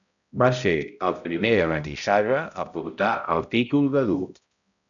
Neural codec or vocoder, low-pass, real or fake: codec, 16 kHz, 0.5 kbps, X-Codec, HuBERT features, trained on balanced general audio; 7.2 kHz; fake